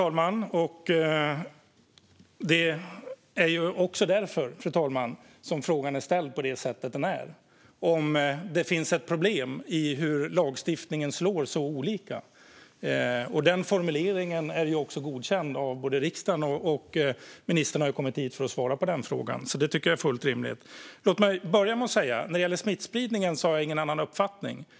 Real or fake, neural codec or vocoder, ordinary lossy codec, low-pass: real; none; none; none